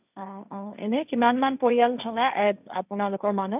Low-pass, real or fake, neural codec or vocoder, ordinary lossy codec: 3.6 kHz; fake; codec, 16 kHz, 1.1 kbps, Voila-Tokenizer; none